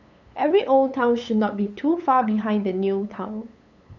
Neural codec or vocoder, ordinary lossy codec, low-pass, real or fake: codec, 16 kHz, 8 kbps, FunCodec, trained on LibriTTS, 25 frames a second; none; 7.2 kHz; fake